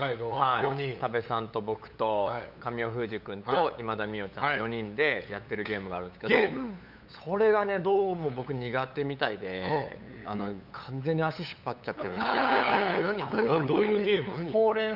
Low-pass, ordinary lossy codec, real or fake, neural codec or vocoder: 5.4 kHz; none; fake; codec, 16 kHz, 8 kbps, FunCodec, trained on LibriTTS, 25 frames a second